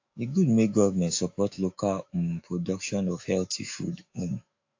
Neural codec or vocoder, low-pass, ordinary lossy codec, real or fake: autoencoder, 48 kHz, 128 numbers a frame, DAC-VAE, trained on Japanese speech; 7.2 kHz; AAC, 48 kbps; fake